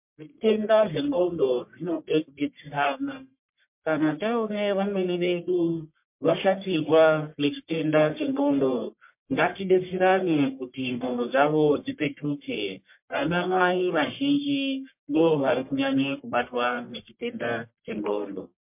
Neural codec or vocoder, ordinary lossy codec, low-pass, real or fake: codec, 44.1 kHz, 1.7 kbps, Pupu-Codec; MP3, 32 kbps; 3.6 kHz; fake